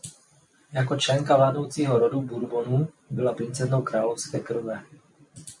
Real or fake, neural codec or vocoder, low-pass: real; none; 10.8 kHz